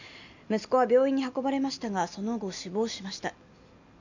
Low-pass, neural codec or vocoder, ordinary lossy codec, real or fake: 7.2 kHz; none; none; real